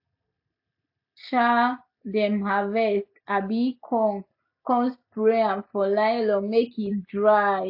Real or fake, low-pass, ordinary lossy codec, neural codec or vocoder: real; 5.4 kHz; AAC, 48 kbps; none